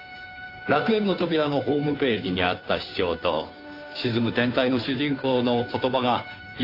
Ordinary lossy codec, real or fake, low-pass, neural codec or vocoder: AAC, 32 kbps; fake; 5.4 kHz; codec, 16 kHz, 2 kbps, FunCodec, trained on Chinese and English, 25 frames a second